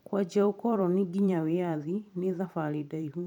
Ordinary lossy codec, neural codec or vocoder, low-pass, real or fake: none; vocoder, 44.1 kHz, 128 mel bands every 512 samples, BigVGAN v2; 19.8 kHz; fake